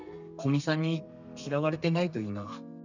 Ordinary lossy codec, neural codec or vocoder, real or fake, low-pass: none; codec, 32 kHz, 1.9 kbps, SNAC; fake; 7.2 kHz